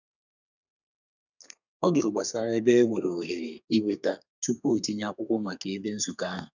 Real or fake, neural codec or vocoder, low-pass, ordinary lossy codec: fake; codec, 32 kHz, 1.9 kbps, SNAC; 7.2 kHz; none